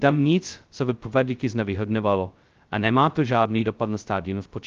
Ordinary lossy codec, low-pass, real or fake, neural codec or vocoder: Opus, 32 kbps; 7.2 kHz; fake; codec, 16 kHz, 0.2 kbps, FocalCodec